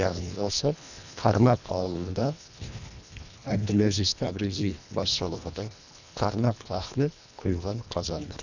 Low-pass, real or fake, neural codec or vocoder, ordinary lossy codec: 7.2 kHz; fake; codec, 24 kHz, 1.5 kbps, HILCodec; none